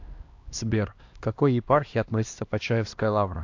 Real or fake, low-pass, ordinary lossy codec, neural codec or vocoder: fake; 7.2 kHz; AAC, 48 kbps; codec, 16 kHz, 1 kbps, X-Codec, HuBERT features, trained on LibriSpeech